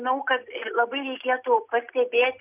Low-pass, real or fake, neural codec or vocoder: 3.6 kHz; real; none